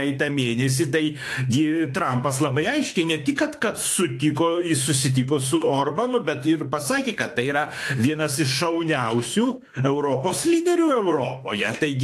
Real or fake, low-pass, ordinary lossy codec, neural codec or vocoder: fake; 14.4 kHz; AAC, 64 kbps; autoencoder, 48 kHz, 32 numbers a frame, DAC-VAE, trained on Japanese speech